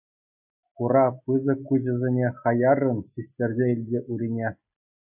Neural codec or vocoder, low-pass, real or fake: none; 3.6 kHz; real